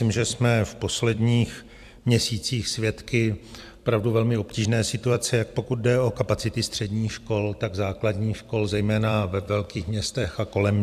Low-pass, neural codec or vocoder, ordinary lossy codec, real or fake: 14.4 kHz; vocoder, 44.1 kHz, 128 mel bands every 256 samples, BigVGAN v2; AAC, 96 kbps; fake